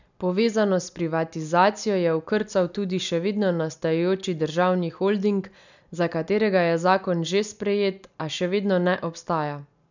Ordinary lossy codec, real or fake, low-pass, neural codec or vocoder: none; real; 7.2 kHz; none